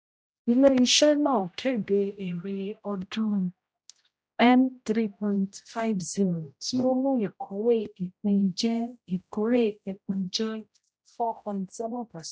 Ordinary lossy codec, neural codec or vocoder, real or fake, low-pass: none; codec, 16 kHz, 0.5 kbps, X-Codec, HuBERT features, trained on general audio; fake; none